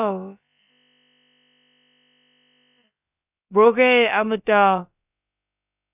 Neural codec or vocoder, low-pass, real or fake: codec, 16 kHz, about 1 kbps, DyCAST, with the encoder's durations; 3.6 kHz; fake